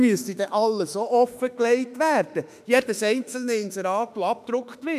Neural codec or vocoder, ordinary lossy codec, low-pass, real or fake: autoencoder, 48 kHz, 32 numbers a frame, DAC-VAE, trained on Japanese speech; none; 14.4 kHz; fake